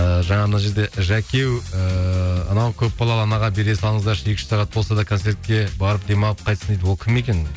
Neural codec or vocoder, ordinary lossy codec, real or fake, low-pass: none; none; real; none